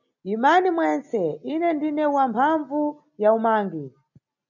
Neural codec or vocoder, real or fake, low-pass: none; real; 7.2 kHz